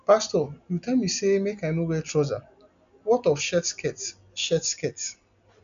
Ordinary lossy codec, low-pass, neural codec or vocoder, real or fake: none; 7.2 kHz; none; real